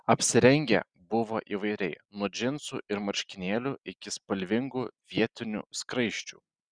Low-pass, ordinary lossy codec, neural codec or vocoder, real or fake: 9.9 kHz; Opus, 64 kbps; none; real